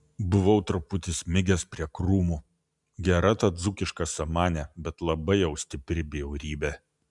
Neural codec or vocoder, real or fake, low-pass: none; real; 10.8 kHz